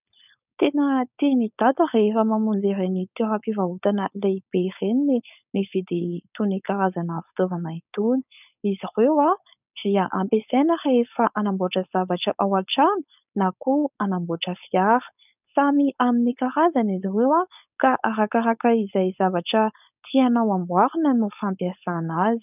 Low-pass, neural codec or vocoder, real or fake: 3.6 kHz; codec, 16 kHz, 4.8 kbps, FACodec; fake